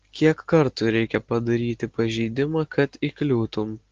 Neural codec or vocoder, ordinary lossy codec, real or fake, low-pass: none; Opus, 16 kbps; real; 7.2 kHz